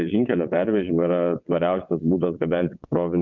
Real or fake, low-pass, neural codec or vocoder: fake; 7.2 kHz; vocoder, 22.05 kHz, 80 mel bands, WaveNeXt